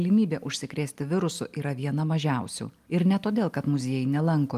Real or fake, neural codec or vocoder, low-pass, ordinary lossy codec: real; none; 14.4 kHz; Opus, 32 kbps